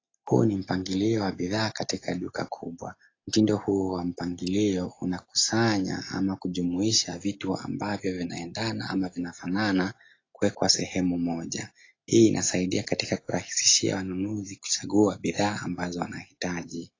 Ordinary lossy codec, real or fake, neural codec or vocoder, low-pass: AAC, 32 kbps; real; none; 7.2 kHz